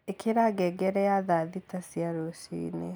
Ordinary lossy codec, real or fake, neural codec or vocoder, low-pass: none; real; none; none